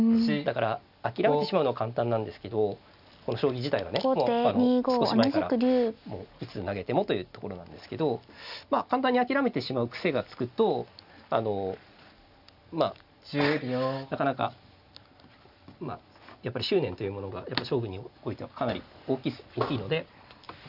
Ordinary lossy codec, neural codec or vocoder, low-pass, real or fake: none; none; 5.4 kHz; real